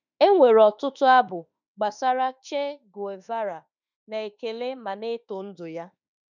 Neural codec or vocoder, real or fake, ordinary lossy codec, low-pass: autoencoder, 48 kHz, 32 numbers a frame, DAC-VAE, trained on Japanese speech; fake; none; 7.2 kHz